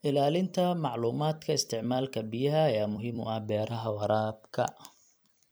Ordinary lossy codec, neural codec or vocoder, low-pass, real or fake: none; vocoder, 44.1 kHz, 128 mel bands every 256 samples, BigVGAN v2; none; fake